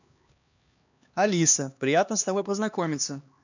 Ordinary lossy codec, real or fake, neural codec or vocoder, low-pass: none; fake; codec, 16 kHz, 2 kbps, X-Codec, HuBERT features, trained on LibriSpeech; 7.2 kHz